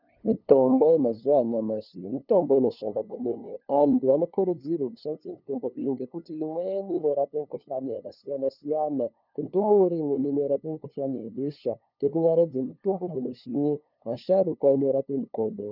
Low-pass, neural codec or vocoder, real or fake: 5.4 kHz; codec, 16 kHz, 2 kbps, FunCodec, trained on LibriTTS, 25 frames a second; fake